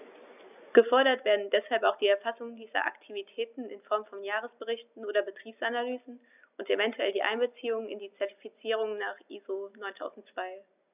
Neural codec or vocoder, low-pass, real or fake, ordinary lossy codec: none; 3.6 kHz; real; none